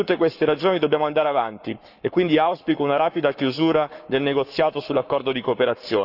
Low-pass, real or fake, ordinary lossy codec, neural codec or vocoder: 5.4 kHz; fake; none; codec, 44.1 kHz, 7.8 kbps, Pupu-Codec